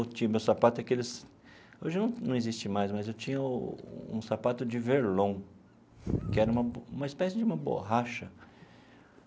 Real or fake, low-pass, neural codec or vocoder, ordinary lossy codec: real; none; none; none